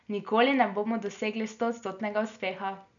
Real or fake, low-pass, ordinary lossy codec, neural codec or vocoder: real; 7.2 kHz; none; none